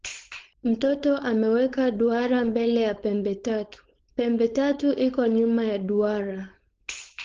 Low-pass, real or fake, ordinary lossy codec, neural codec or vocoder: 7.2 kHz; fake; Opus, 16 kbps; codec, 16 kHz, 4.8 kbps, FACodec